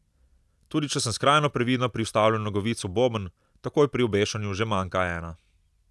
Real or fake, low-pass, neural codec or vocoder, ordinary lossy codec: real; none; none; none